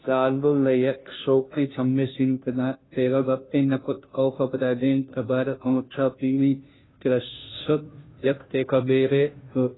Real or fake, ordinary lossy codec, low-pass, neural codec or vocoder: fake; AAC, 16 kbps; 7.2 kHz; codec, 16 kHz, 0.5 kbps, FunCodec, trained on Chinese and English, 25 frames a second